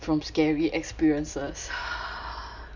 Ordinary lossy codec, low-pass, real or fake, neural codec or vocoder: none; 7.2 kHz; real; none